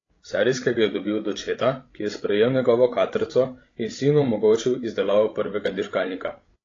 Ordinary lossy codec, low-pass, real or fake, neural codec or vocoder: AAC, 32 kbps; 7.2 kHz; fake; codec, 16 kHz, 16 kbps, FreqCodec, larger model